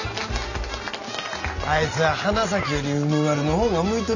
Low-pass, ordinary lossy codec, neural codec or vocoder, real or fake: 7.2 kHz; none; none; real